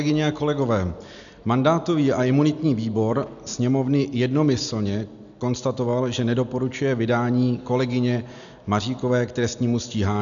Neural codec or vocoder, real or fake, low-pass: none; real; 7.2 kHz